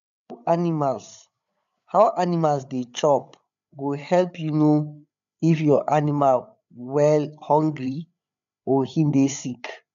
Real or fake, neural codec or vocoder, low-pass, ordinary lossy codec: fake; codec, 16 kHz, 8 kbps, FreqCodec, larger model; 7.2 kHz; none